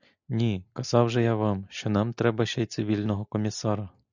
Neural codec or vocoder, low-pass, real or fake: none; 7.2 kHz; real